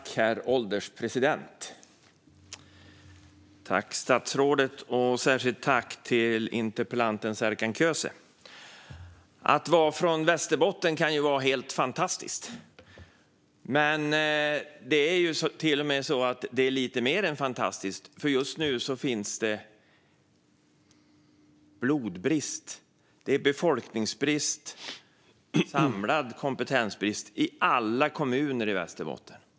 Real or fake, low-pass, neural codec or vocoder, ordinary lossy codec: real; none; none; none